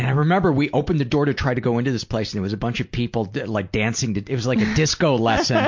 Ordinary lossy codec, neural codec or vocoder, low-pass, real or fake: MP3, 48 kbps; none; 7.2 kHz; real